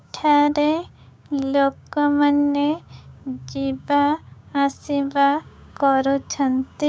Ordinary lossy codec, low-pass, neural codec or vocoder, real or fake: none; none; codec, 16 kHz, 6 kbps, DAC; fake